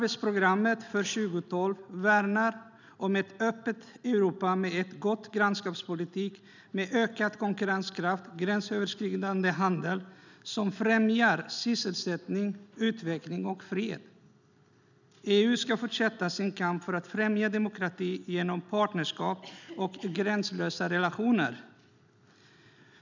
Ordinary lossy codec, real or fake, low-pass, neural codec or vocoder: none; real; 7.2 kHz; none